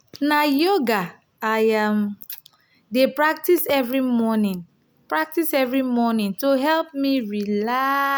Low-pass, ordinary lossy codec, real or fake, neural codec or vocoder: none; none; real; none